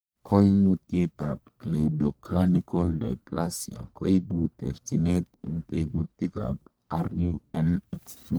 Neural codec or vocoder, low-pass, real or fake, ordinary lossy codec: codec, 44.1 kHz, 1.7 kbps, Pupu-Codec; none; fake; none